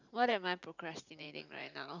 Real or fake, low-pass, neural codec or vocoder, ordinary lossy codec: fake; 7.2 kHz; vocoder, 44.1 kHz, 128 mel bands every 512 samples, BigVGAN v2; none